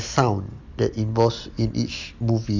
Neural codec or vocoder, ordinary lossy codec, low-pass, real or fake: none; MP3, 64 kbps; 7.2 kHz; real